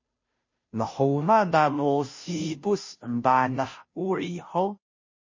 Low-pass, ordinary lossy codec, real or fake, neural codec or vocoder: 7.2 kHz; MP3, 32 kbps; fake; codec, 16 kHz, 0.5 kbps, FunCodec, trained on Chinese and English, 25 frames a second